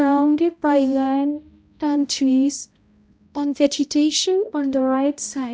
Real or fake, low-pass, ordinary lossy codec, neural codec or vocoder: fake; none; none; codec, 16 kHz, 0.5 kbps, X-Codec, HuBERT features, trained on balanced general audio